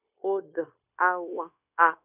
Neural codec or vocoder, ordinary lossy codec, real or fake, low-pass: codec, 16 kHz, 0.9 kbps, LongCat-Audio-Codec; none; fake; 3.6 kHz